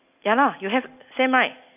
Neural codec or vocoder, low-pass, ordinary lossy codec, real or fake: none; 3.6 kHz; none; real